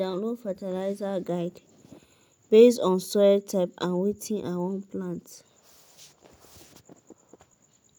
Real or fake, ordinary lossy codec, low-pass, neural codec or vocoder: real; none; none; none